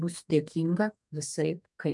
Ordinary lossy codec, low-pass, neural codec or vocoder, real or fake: MP3, 96 kbps; 10.8 kHz; codec, 32 kHz, 1.9 kbps, SNAC; fake